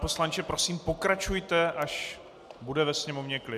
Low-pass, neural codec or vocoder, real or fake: 14.4 kHz; none; real